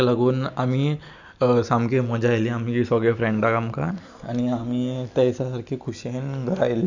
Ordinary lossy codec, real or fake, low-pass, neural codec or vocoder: none; real; 7.2 kHz; none